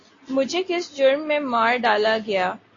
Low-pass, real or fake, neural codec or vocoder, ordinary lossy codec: 7.2 kHz; real; none; AAC, 32 kbps